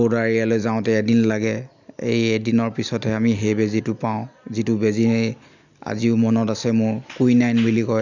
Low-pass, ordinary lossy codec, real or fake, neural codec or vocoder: 7.2 kHz; none; real; none